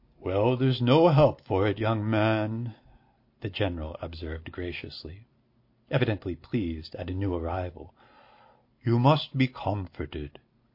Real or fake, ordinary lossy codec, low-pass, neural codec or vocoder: real; MP3, 32 kbps; 5.4 kHz; none